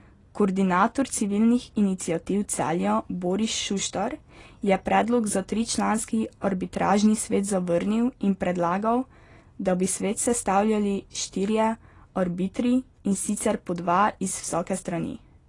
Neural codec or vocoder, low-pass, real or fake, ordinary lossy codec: none; 10.8 kHz; real; AAC, 32 kbps